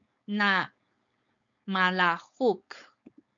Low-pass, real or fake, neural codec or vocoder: 7.2 kHz; fake; codec, 16 kHz, 4.8 kbps, FACodec